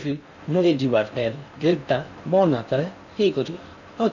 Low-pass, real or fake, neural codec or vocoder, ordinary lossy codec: 7.2 kHz; fake; codec, 16 kHz in and 24 kHz out, 0.6 kbps, FocalCodec, streaming, 4096 codes; none